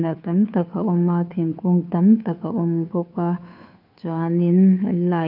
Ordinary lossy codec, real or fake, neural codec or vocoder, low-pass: none; fake; codec, 16 kHz, 2 kbps, FunCodec, trained on Chinese and English, 25 frames a second; 5.4 kHz